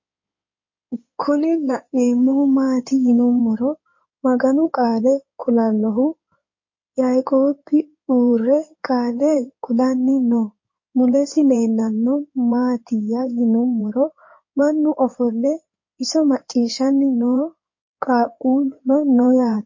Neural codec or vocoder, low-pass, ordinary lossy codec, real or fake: codec, 16 kHz in and 24 kHz out, 2.2 kbps, FireRedTTS-2 codec; 7.2 kHz; MP3, 32 kbps; fake